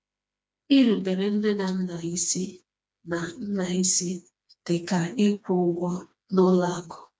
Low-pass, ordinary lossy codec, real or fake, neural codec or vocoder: none; none; fake; codec, 16 kHz, 2 kbps, FreqCodec, smaller model